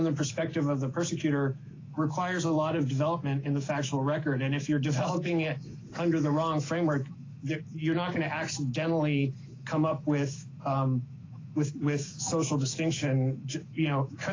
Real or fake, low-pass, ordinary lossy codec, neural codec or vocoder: fake; 7.2 kHz; AAC, 32 kbps; autoencoder, 48 kHz, 128 numbers a frame, DAC-VAE, trained on Japanese speech